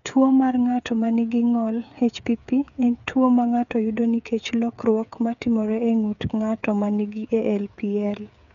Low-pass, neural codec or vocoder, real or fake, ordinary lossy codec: 7.2 kHz; codec, 16 kHz, 8 kbps, FreqCodec, smaller model; fake; none